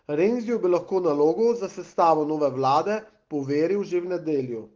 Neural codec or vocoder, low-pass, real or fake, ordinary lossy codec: none; 7.2 kHz; real; Opus, 16 kbps